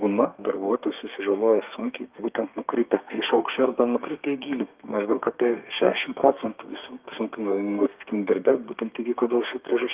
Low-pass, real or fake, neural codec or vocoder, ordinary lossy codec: 3.6 kHz; fake; codec, 32 kHz, 1.9 kbps, SNAC; Opus, 32 kbps